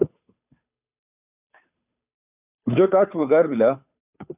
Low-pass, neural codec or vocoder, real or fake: 3.6 kHz; codec, 16 kHz, 2 kbps, FunCodec, trained on Chinese and English, 25 frames a second; fake